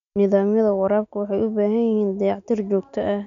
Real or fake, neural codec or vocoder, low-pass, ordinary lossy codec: real; none; 7.2 kHz; none